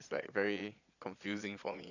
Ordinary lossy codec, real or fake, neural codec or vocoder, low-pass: none; fake; vocoder, 22.05 kHz, 80 mel bands, Vocos; 7.2 kHz